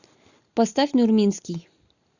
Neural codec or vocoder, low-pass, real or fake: none; 7.2 kHz; real